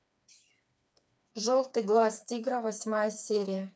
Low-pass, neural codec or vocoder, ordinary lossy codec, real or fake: none; codec, 16 kHz, 4 kbps, FreqCodec, smaller model; none; fake